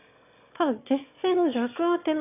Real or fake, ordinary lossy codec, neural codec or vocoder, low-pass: fake; none; autoencoder, 22.05 kHz, a latent of 192 numbers a frame, VITS, trained on one speaker; 3.6 kHz